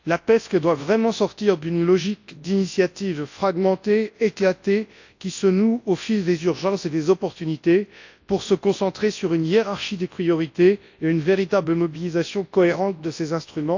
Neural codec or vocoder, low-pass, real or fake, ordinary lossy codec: codec, 24 kHz, 0.9 kbps, WavTokenizer, large speech release; 7.2 kHz; fake; AAC, 48 kbps